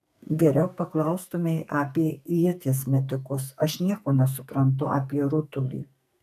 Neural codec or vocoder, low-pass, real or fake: codec, 44.1 kHz, 2.6 kbps, SNAC; 14.4 kHz; fake